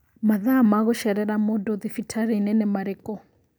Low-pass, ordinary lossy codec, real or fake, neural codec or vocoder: none; none; real; none